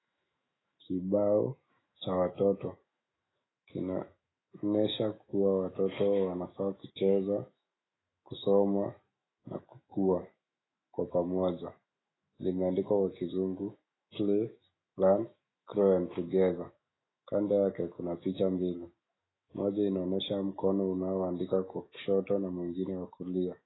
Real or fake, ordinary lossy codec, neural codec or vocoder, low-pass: fake; AAC, 16 kbps; autoencoder, 48 kHz, 128 numbers a frame, DAC-VAE, trained on Japanese speech; 7.2 kHz